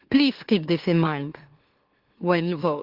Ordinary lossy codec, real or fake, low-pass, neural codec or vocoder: Opus, 16 kbps; fake; 5.4 kHz; autoencoder, 44.1 kHz, a latent of 192 numbers a frame, MeloTTS